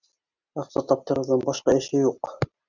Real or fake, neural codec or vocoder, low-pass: real; none; 7.2 kHz